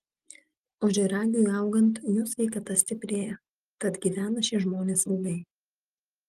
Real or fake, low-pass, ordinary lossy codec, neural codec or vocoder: real; 14.4 kHz; Opus, 24 kbps; none